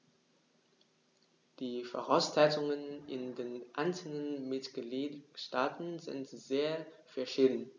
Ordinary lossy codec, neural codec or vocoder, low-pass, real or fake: none; none; 7.2 kHz; real